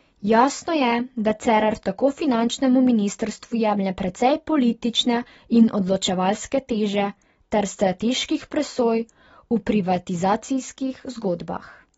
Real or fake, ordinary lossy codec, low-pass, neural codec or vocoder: real; AAC, 24 kbps; 19.8 kHz; none